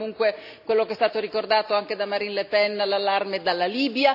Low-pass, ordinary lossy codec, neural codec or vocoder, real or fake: 5.4 kHz; none; none; real